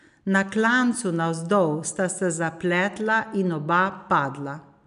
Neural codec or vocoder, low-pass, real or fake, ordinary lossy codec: none; 10.8 kHz; real; MP3, 96 kbps